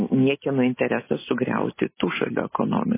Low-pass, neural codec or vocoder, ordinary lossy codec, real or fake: 3.6 kHz; none; MP3, 16 kbps; real